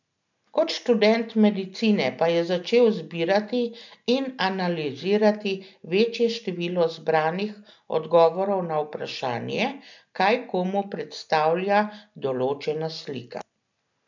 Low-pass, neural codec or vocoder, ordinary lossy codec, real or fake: 7.2 kHz; none; none; real